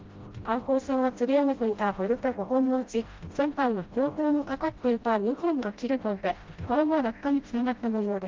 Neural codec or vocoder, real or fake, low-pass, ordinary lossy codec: codec, 16 kHz, 0.5 kbps, FreqCodec, smaller model; fake; 7.2 kHz; Opus, 32 kbps